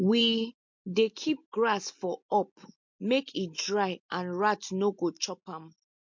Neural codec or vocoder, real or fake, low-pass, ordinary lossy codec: none; real; 7.2 kHz; MP3, 48 kbps